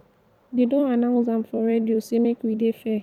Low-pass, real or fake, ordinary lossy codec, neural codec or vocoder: 19.8 kHz; fake; none; vocoder, 44.1 kHz, 128 mel bands every 256 samples, BigVGAN v2